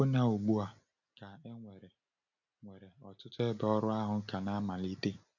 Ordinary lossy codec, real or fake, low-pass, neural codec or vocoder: none; real; 7.2 kHz; none